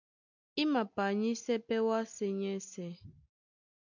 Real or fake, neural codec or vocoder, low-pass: real; none; 7.2 kHz